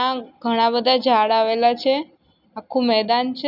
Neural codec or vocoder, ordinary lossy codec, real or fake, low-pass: none; none; real; 5.4 kHz